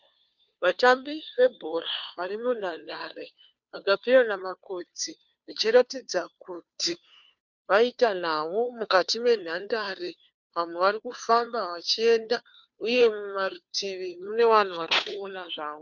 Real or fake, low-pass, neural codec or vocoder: fake; 7.2 kHz; codec, 16 kHz, 2 kbps, FunCodec, trained on Chinese and English, 25 frames a second